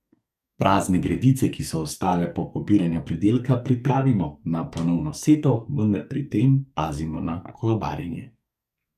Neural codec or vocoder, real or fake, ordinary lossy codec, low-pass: codec, 44.1 kHz, 2.6 kbps, SNAC; fake; none; 14.4 kHz